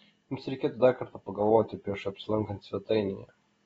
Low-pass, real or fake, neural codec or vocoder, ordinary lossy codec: 10.8 kHz; real; none; AAC, 24 kbps